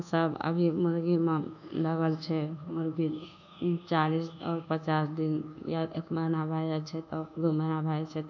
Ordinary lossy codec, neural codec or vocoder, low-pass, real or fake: none; codec, 24 kHz, 1.2 kbps, DualCodec; 7.2 kHz; fake